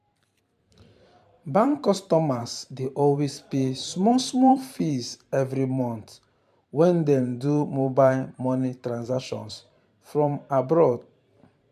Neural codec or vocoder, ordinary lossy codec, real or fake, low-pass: vocoder, 44.1 kHz, 128 mel bands every 512 samples, BigVGAN v2; MP3, 96 kbps; fake; 14.4 kHz